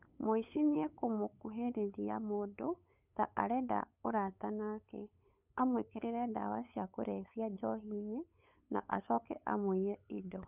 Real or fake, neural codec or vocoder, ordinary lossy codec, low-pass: fake; codec, 16 kHz, 8 kbps, FunCodec, trained on LibriTTS, 25 frames a second; none; 3.6 kHz